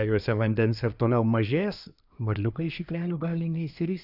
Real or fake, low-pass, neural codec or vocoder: fake; 5.4 kHz; codec, 24 kHz, 1 kbps, SNAC